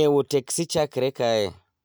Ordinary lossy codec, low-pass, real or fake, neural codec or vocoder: none; none; real; none